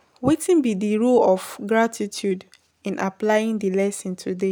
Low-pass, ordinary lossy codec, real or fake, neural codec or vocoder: none; none; real; none